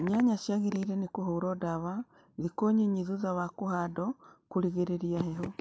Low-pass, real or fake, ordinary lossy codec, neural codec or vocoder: none; real; none; none